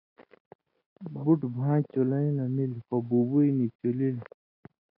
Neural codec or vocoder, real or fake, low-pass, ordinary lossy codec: none; real; 5.4 kHz; AAC, 24 kbps